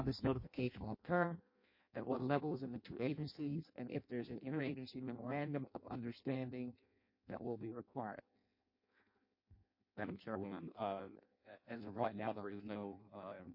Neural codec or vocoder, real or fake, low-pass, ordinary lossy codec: codec, 16 kHz in and 24 kHz out, 0.6 kbps, FireRedTTS-2 codec; fake; 5.4 kHz; MP3, 32 kbps